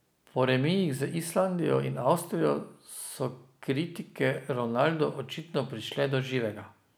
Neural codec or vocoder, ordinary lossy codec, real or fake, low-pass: none; none; real; none